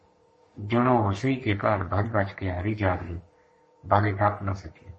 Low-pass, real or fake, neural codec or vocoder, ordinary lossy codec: 10.8 kHz; fake; codec, 44.1 kHz, 3.4 kbps, Pupu-Codec; MP3, 32 kbps